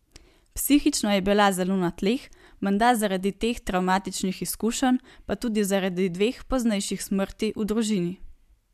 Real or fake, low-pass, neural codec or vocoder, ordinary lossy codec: real; 14.4 kHz; none; MP3, 96 kbps